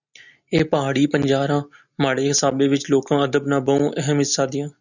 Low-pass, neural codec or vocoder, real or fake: 7.2 kHz; none; real